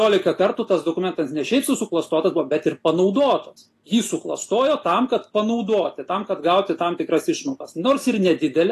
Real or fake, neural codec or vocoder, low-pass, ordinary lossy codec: real; none; 14.4 kHz; AAC, 48 kbps